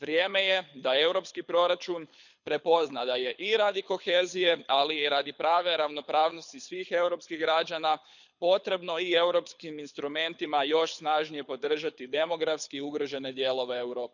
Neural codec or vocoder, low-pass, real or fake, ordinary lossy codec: codec, 24 kHz, 6 kbps, HILCodec; 7.2 kHz; fake; none